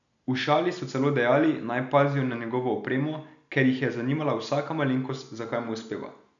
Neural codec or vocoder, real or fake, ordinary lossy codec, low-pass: none; real; none; 7.2 kHz